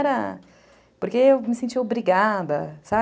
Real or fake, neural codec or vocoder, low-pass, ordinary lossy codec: real; none; none; none